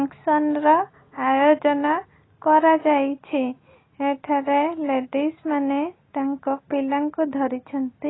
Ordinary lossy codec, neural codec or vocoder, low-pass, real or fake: AAC, 16 kbps; none; 7.2 kHz; real